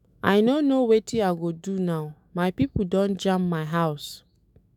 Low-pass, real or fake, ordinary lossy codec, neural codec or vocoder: 19.8 kHz; fake; none; autoencoder, 48 kHz, 128 numbers a frame, DAC-VAE, trained on Japanese speech